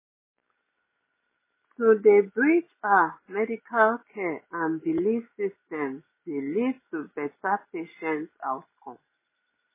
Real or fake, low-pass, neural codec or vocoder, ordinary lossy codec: real; 3.6 kHz; none; MP3, 16 kbps